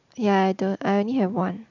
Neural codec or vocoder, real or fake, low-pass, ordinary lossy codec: none; real; 7.2 kHz; none